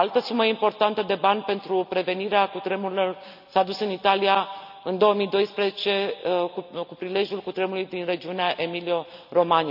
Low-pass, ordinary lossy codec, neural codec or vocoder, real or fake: 5.4 kHz; none; none; real